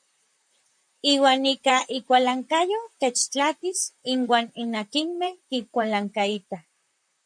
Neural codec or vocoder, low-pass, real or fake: vocoder, 44.1 kHz, 128 mel bands, Pupu-Vocoder; 9.9 kHz; fake